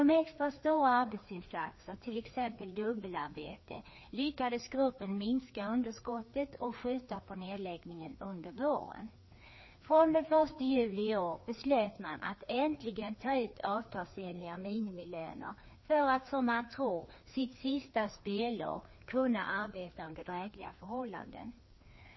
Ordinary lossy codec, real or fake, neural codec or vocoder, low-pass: MP3, 24 kbps; fake; codec, 16 kHz, 2 kbps, FreqCodec, larger model; 7.2 kHz